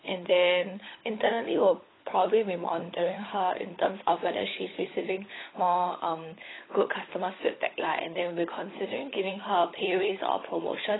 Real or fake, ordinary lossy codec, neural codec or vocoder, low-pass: fake; AAC, 16 kbps; codec, 16 kHz, 16 kbps, FunCodec, trained on LibriTTS, 50 frames a second; 7.2 kHz